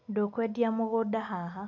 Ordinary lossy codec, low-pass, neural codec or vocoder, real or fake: none; 7.2 kHz; none; real